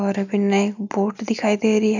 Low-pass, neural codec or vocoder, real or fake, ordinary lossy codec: 7.2 kHz; none; real; none